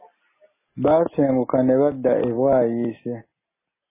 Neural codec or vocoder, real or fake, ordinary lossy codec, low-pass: none; real; MP3, 16 kbps; 3.6 kHz